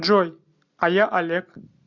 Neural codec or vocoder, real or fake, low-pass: none; real; 7.2 kHz